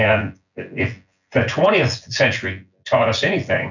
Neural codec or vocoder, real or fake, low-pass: vocoder, 24 kHz, 100 mel bands, Vocos; fake; 7.2 kHz